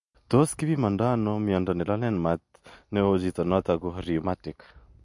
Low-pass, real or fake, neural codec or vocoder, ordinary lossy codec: 10.8 kHz; real; none; MP3, 48 kbps